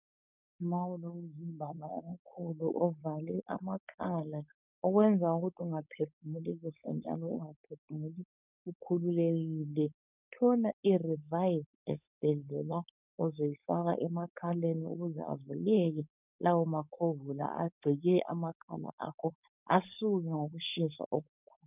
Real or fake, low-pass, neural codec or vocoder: fake; 3.6 kHz; codec, 16 kHz, 4.8 kbps, FACodec